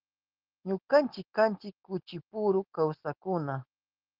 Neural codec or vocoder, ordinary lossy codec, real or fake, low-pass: none; Opus, 16 kbps; real; 5.4 kHz